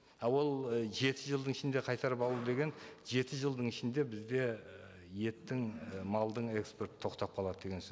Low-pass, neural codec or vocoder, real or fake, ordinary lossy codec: none; none; real; none